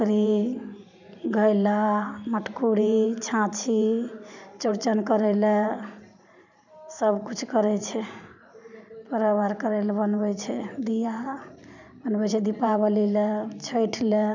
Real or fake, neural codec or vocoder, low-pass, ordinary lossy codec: fake; vocoder, 44.1 kHz, 128 mel bands every 512 samples, BigVGAN v2; 7.2 kHz; none